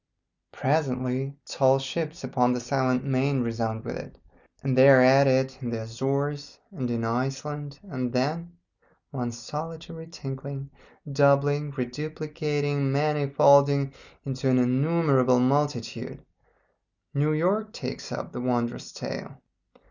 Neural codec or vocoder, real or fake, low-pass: none; real; 7.2 kHz